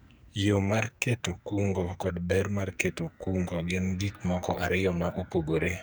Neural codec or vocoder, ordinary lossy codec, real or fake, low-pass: codec, 44.1 kHz, 2.6 kbps, SNAC; none; fake; none